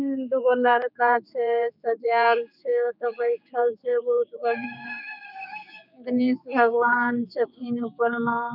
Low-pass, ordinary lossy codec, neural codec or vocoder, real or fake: 5.4 kHz; none; codec, 16 kHz, 4 kbps, X-Codec, HuBERT features, trained on general audio; fake